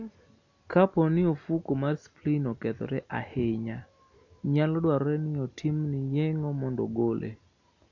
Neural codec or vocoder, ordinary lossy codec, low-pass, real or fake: none; none; 7.2 kHz; real